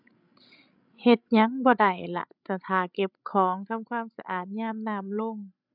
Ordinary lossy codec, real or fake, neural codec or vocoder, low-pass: none; fake; codec, 16 kHz, 16 kbps, FreqCodec, larger model; 5.4 kHz